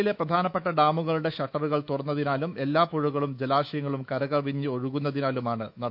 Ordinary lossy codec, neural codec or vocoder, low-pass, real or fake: none; autoencoder, 48 kHz, 128 numbers a frame, DAC-VAE, trained on Japanese speech; 5.4 kHz; fake